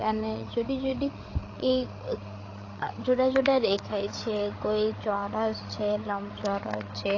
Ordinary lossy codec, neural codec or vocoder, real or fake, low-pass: AAC, 32 kbps; codec, 16 kHz, 8 kbps, FreqCodec, larger model; fake; 7.2 kHz